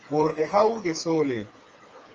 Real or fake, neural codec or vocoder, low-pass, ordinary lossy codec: fake; codec, 16 kHz, 4 kbps, FreqCodec, smaller model; 7.2 kHz; Opus, 24 kbps